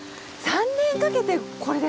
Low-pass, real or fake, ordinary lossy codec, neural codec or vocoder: none; real; none; none